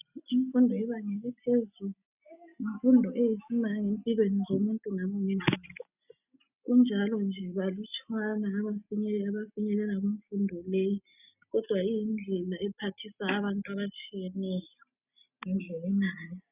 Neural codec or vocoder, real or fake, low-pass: none; real; 3.6 kHz